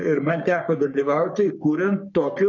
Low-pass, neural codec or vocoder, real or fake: 7.2 kHz; codec, 44.1 kHz, 3.4 kbps, Pupu-Codec; fake